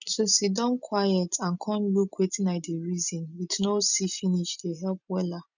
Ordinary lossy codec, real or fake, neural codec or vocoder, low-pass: none; real; none; 7.2 kHz